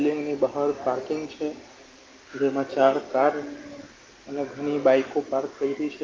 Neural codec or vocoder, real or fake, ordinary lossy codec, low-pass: none; real; Opus, 32 kbps; 7.2 kHz